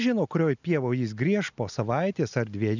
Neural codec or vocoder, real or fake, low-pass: none; real; 7.2 kHz